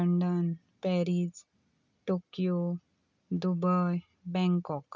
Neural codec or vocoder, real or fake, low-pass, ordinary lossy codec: none; real; 7.2 kHz; none